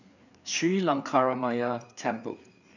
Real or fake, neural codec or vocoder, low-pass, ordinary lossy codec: fake; codec, 16 kHz, 4 kbps, FreqCodec, larger model; 7.2 kHz; none